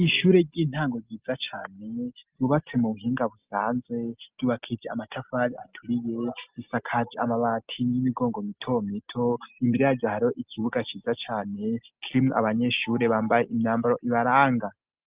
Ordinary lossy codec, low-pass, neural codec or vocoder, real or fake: Opus, 24 kbps; 3.6 kHz; none; real